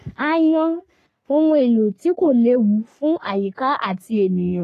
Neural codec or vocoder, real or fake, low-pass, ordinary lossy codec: codec, 44.1 kHz, 3.4 kbps, Pupu-Codec; fake; 14.4 kHz; AAC, 48 kbps